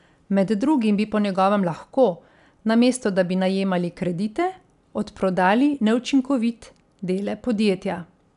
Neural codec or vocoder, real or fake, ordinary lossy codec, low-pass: none; real; none; 10.8 kHz